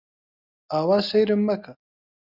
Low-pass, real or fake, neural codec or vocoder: 5.4 kHz; real; none